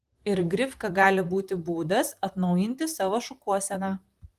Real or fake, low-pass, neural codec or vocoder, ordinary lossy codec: fake; 14.4 kHz; vocoder, 44.1 kHz, 128 mel bands, Pupu-Vocoder; Opus, 24 kbps